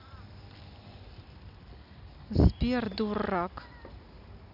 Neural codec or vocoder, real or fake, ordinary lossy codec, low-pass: none; real; none; 5.4 kHz